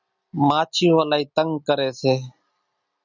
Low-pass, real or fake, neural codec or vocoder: 7.2 kHz; real; none